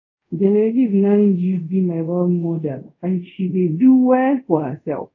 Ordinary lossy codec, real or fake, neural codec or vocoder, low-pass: AAC, 48 kbps; fake; codec, 24 kHz, 0.5 kbps, DualCodec; 7.2 kHz